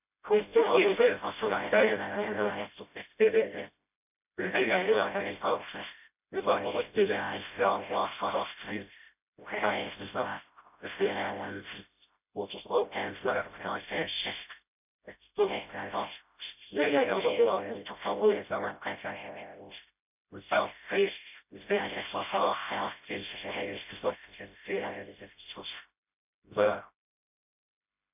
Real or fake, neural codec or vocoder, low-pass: fake; codec, 16 kHz, 0.5 kbps, FreqCodec, smaller model; 3.6 kHz